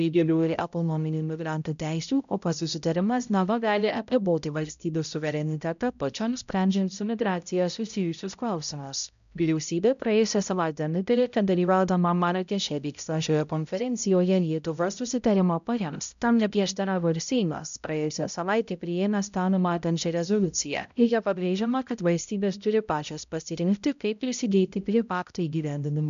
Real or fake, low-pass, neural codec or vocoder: fake; 7.2 kHz; codec, 16 kHz, 0.5 kbps, X-Codec, HuBERT features, trained on balanced general audio